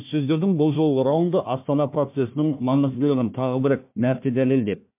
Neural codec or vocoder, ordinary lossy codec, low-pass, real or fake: codec, 16 kHz, 1 kbps, FunCodec, trained on Chinese and English, 50 frames a second; MP3, 32 kbps; 3.6 kHz; fake